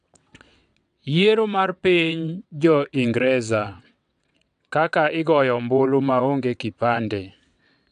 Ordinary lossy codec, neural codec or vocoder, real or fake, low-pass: AAC, 96 kbps; vocoder, 22.05 kHz, 80 mel bands, WaveNeXt; fake; 9.9 kHz